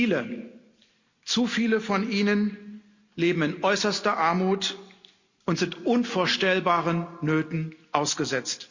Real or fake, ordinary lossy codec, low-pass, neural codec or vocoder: real; Opus, 64 kbps; 7.2 kHz; none